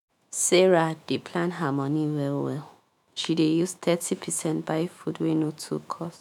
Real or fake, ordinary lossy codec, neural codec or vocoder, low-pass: fake; none; autoencoder, 48 kHz, 128 numbers a frame, DAC-VAE, trained on Japanese speech; 19.8 kHz